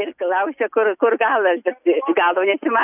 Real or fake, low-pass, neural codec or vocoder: real; 3.6 kHz; none